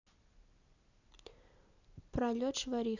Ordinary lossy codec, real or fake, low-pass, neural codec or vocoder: none; real; 7.2 kHz; none